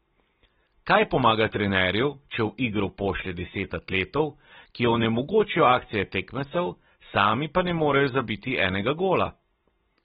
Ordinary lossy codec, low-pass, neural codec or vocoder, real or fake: AAC, 16 kbps; 7.2 kHz; none; real